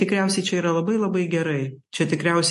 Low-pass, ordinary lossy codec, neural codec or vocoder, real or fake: 14.4 kHz; MP3, 48 kbps; none; real